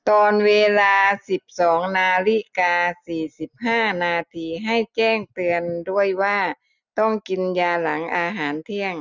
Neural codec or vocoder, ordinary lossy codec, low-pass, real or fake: none; none; 7.2 kHz; real